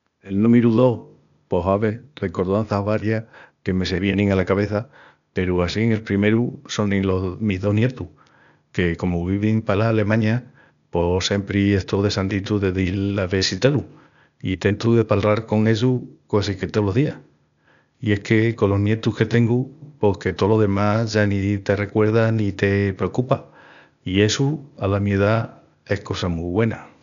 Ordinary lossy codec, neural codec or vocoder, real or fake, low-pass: none; codec, 16 kHz, 0.8 kbps, ZipCodec; fake; 7.2 kHz